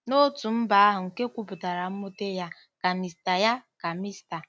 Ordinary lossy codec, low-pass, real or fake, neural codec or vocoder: none; none; real; none